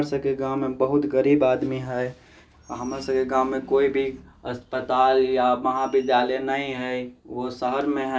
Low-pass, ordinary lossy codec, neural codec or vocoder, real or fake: none; none; none; real